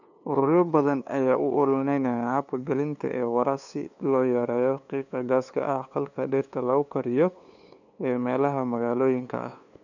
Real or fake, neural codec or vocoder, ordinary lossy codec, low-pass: fake; codec, 16 kHz, 2 kbps, FunCodec, trained on LibriTTS, 25 frames a second; none; 7.2 kHz